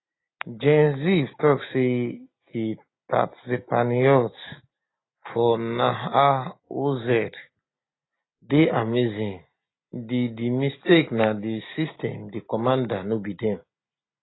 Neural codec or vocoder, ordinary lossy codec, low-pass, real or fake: none; AAC, 16 kbps; 7.2 kHz; real